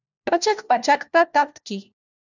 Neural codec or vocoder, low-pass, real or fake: codec, 16 kHz, 1 kbps, FunCodec, trained on LibriTTS, 50 frames a second; 7.2 kHz; fake